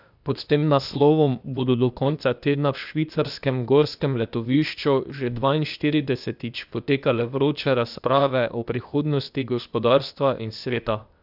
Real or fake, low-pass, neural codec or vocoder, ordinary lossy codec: fake; 5.4 kHz; codec, 16 kHz, 0.8 kbps, ZipCodec; none